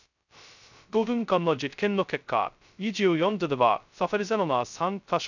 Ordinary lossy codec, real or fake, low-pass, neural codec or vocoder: none; fake; 7.2 kHz; codec, 16 kHz, 0.2 kbps, FocalCodec